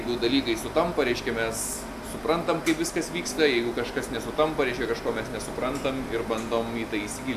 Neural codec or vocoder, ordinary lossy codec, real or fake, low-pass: none; AAC, 96 kbps; real; 14.4 kHz